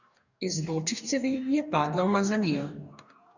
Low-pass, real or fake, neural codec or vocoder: 7.2 kHz; fake; codec, 44.1 kHz, 2.6 kbps, DAC